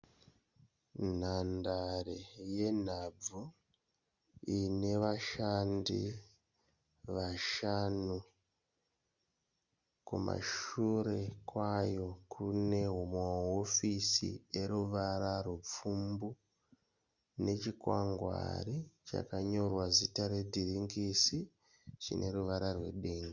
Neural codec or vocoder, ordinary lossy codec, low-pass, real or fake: none; Opus, 64 kbps; 7.2 kHz; real